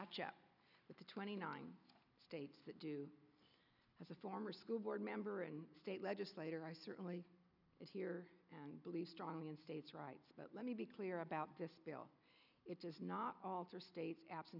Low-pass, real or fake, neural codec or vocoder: 5.4 kHz; real; none